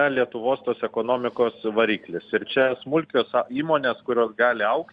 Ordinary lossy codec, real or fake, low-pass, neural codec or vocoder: Opus, 64 kbps; real; 9.9 kHz; none